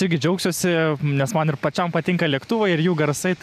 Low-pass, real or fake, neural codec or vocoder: 14.4 kHz; real; none